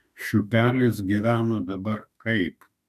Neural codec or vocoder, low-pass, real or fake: autoencoder, 48 kHz, 32 numbers a frame, DAC-VAE, trained on Japanese speech; 14.4 kHz; fake